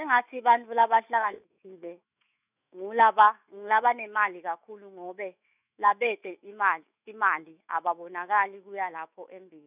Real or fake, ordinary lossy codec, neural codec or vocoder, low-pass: fake; none; autoencoder, 48 kHz, 128 numbers a frame, DAC-VAE, trained on Japanese speech; 3.6 kHz